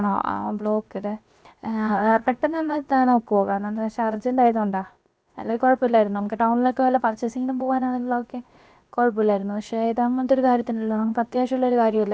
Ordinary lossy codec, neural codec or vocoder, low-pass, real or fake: none; codec, 16 kHz, 0.7 kbps, FocalCodec; none; fake